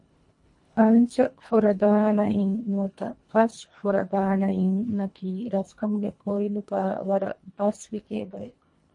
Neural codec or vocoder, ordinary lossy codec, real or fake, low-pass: codec, 24 kHz, 1.5 kbps, HILCodec; MP3, 48 kbps; fake; 10.8 kHz